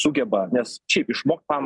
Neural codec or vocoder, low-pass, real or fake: none; 10.8 kHz; real